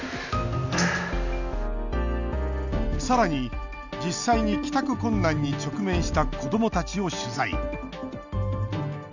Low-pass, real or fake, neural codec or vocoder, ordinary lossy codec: 7.2 kHz; real; none; none